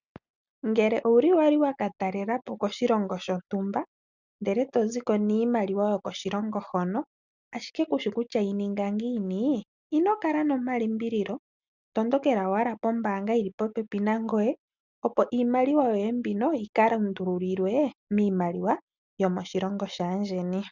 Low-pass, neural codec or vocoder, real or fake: 7.2 kHz; none; real